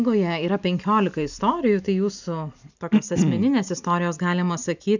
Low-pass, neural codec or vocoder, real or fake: 7.2 kHz; none; real